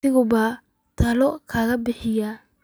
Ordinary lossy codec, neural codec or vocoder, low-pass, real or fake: none; none; none; real